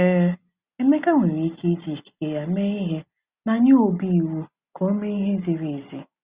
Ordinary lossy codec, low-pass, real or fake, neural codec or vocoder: Opus, 64 kbps; 3.6 kHz; real; none